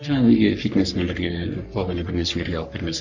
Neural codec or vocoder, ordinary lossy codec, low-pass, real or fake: codec, 44.1 kHz, 3.4 kbps, Pupu-Codec; none; 7.2 kHz; fake